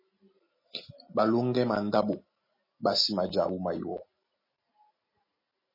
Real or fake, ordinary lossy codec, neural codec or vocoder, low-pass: real; MP3, 24 kbps; none; 5.4 kHz